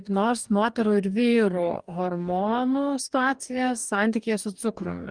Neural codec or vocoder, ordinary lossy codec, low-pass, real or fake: codec, 44.1 kHz, 2.6 kbps, DAC; Opus, 32 kbps; 9.9 kHz; fake